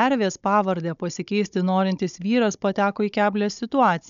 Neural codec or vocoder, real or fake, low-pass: codec, 16 kHz, 16 kbps, FunCodec, trained on Chinese and English, 50 frames a second; fake; 7.2 kHz